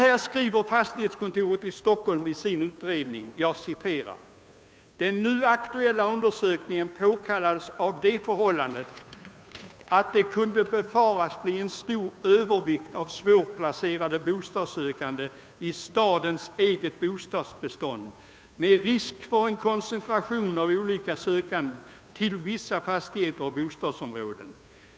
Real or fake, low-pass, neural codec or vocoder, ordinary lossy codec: fake; none; codec, 16 kHz, 2 kbps, FunCodec, trained on Chinese and English, 25 frames a second; none